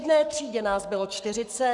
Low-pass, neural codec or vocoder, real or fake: 10.8 kHz; codec, 44.1 kHz, 7.8 kbps, Pupu-Codec; fake